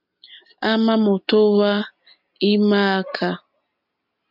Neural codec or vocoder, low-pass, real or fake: none; 5.4 kHz; real